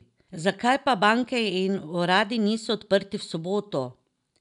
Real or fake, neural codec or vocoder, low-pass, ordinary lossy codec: real; none; 10.8 kHz; none